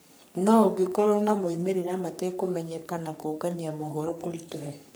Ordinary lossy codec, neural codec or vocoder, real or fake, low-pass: none; codec, 44.1 kHz, 3.4 kbps, Pupu-Codec; fake; none